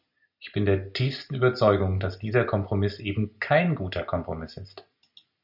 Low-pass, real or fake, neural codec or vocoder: 5.4 kHz; real; none